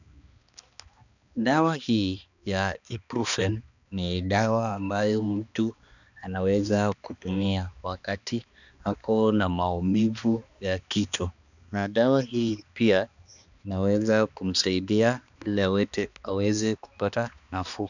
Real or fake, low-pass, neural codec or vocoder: fake; 7.2 kHz; codec, 16 kHz, 2 kbps, X-Codec, HuBERT features, trained on balanced general audio